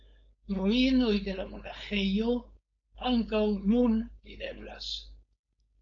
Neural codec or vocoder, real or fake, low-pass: codec, 16 kHz, 4.8 kbps, FACodec; fake; 7.2 kHz